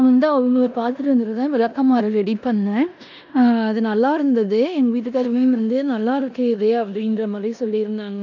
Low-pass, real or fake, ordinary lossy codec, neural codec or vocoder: 7.2 kHz; fake; none; codec, 16 kHz in and 24 kHz out, 0.9 kbps, LongCat-Audio-Codec, four codebook decoder